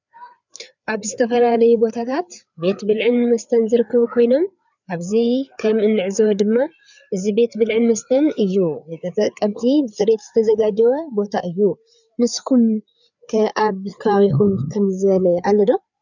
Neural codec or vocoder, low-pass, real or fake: codec, 16 kHz, 4 kbps, FreqCodec, larger model; 7.2 kHz; fake